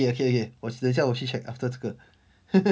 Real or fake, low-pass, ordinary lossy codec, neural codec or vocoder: real; none; none; none